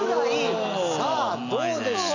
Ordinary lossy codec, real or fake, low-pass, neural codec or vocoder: none; real; 7.2 kHz; none